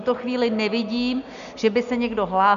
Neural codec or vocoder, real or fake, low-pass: none; real; 7.2 kHz